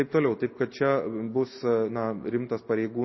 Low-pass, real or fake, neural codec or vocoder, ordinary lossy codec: 7.2 kHz; real; none; MP3, 24 kbps